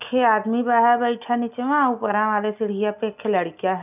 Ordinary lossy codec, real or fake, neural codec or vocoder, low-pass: none; real; none; 3.6 kHz